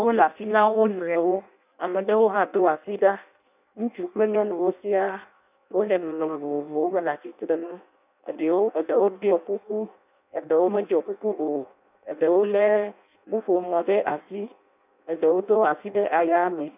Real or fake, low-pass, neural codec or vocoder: fake; 3.6 kHz; codec, 16 kHz in and 24 kHz out, 0.6 kbps, FireRedTTS-2 codec